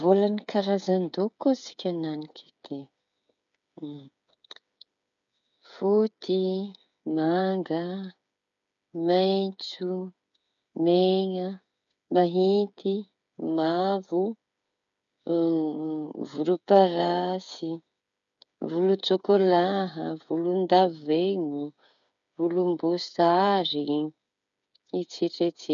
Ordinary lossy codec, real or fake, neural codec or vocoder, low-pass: none; fake; codec, 16 kHz, 8 kbps, FreqCodec, smaller model; 7.2 kHz